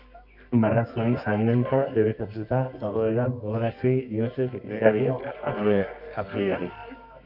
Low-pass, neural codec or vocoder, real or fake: 5.4 kHz; codec, 24 kHz, 0.9 kbps, WavTokenizer, medium music audio release; fake